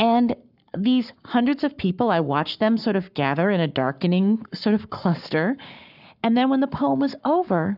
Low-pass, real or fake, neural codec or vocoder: 5.4 kHz; fake; codec, 44.1 kHz, 7.8 kbps, Pupu-Codec